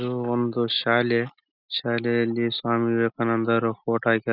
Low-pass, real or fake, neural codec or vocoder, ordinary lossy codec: 5.4 kHz; real; none; none